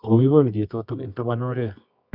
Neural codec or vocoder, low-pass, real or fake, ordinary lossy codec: codec, 24 kHz, 0.9 kbps, WavTokenizer, medium music audio release; 5.4 kHz; fake; none